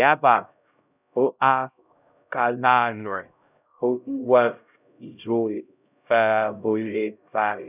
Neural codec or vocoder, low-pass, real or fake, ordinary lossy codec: codec, 16 kHz, 0.5 kbps, X-Codec, HuBERT features, trained on LibriSpeech; 3.6 kHz; fake; none